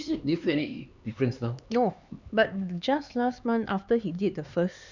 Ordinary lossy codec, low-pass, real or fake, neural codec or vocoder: none; 7.2 kHz; fake; codec, 16 kHz, 4 kbps, X-Codec, HuBERT features, trained on LibriSpeech